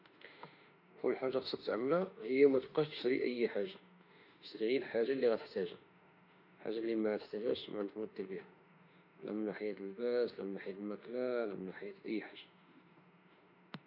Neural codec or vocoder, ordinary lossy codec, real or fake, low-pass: autoencoder, 48 kHz, 32 numbers a frame, DAC-VAE, trained on Japanese speech; none; fake; 5.4 kHz